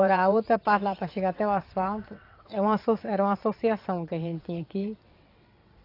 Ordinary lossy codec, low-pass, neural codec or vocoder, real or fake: AAC, 32 kbps; 5.4 kHz; vocoder, 22.05 kHz, 80 mel bands, WaveNeXt; fake